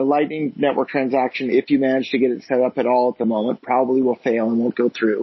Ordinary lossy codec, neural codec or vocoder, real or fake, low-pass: MP3, 24 kbps; none; real; 7.2 kHz